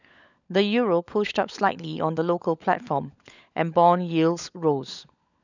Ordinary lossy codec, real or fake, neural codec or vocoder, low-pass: none; fake; codec, 16 kHz, 16 kbps, FunCodec, trained on LibriTTS, 50 frames a second; 7.2 kHz